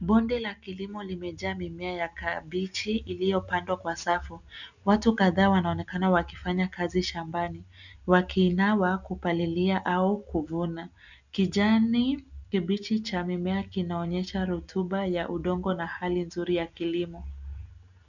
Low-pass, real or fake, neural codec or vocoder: 7.2 kHz; real; none